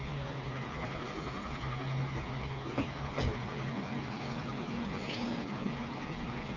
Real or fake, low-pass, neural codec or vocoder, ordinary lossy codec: fake; 7.2 kHz; codec, 16 kHz, 4 kbps, FreqCodec, smaller model; AAC, 32 kbps